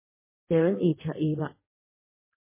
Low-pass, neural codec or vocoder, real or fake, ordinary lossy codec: 3.6 kHz; codec, 16 kHz in and 24 kHz out, 1.1 kbps, FireRedTTS-2 codec; fake; MP3, 16 kbps